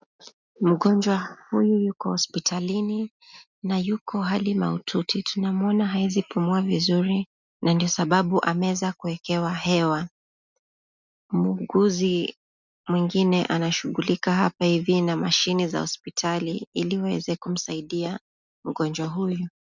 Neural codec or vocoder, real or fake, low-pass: none; real; 7.2 kHz